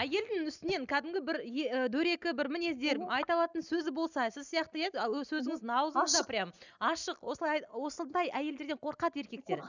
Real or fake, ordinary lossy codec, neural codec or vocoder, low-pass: real; none; none; 7.2 kHz